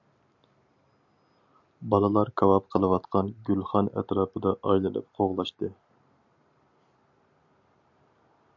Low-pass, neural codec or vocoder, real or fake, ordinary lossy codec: 7.2 kHz; none; real; MP3, 64 kbps